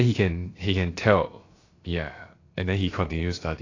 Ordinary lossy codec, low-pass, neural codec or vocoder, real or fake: AAC, 32 kbps; 7.2 kHz; codec, 16 kHz, about 1 kbps, DyCAST, with the encoder's durations; fake